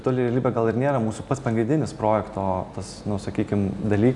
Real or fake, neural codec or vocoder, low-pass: real; none; 10.8 kHz